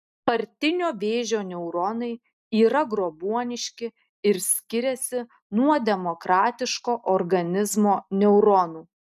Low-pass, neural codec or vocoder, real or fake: 14.4 kHz; none; real